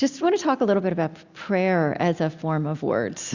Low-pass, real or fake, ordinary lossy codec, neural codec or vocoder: 7.2 kHz; real; Opus, 64 kbps; none